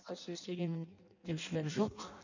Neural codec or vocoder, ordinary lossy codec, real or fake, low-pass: codec, 16 kHz in and 24 kHz out, 0.6 kbps, FireRedTTS-2 codec; none; fake; 7.2 kHz